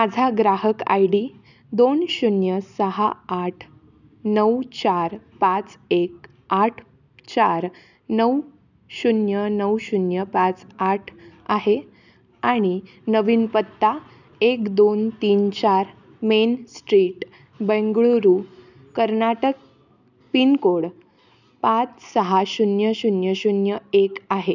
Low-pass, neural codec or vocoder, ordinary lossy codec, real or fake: 7.2 kHz; none; none; real